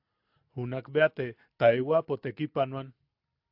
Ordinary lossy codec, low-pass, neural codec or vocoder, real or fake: AAC, 48 kbps; 5.4 kHz; none; real